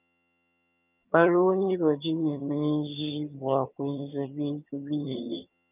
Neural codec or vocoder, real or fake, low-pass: vocoder, 22.05 kHz, 80 mel bands, HiFi-GAN; fake; 3.6 kHz